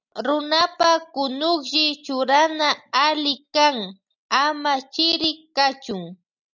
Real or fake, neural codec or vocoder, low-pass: real; none; 7.2 kHz